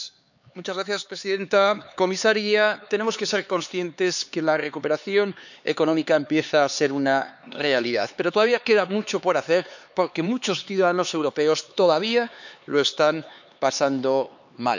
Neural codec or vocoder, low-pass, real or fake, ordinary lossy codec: codec, 16 kHz, 4 kbps, X-Codec, HuBERT features, trained on LibriSpeech; 7.2 kHz; fake; none